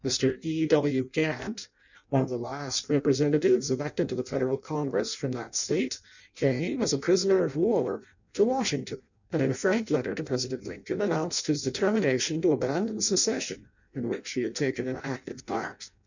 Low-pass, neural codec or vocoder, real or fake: 7.2 kHz; codec, 16 kHz in and 24 kHz out, 0.6 kbps, FireRedTTS-2 codec; fake